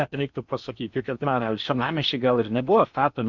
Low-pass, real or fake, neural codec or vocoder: 7.2 kHz; fake; codec, 16 kHz in and 24 kHz out, 0.8 kbps, FocalCodec, streaming, 65536 codes